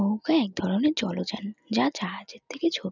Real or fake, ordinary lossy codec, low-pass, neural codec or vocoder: real; none; 7.2 kHz; none